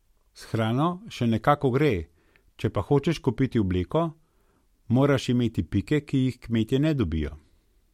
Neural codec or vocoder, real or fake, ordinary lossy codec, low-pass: none; real; MP3, 64 kbps; 19.8 kHz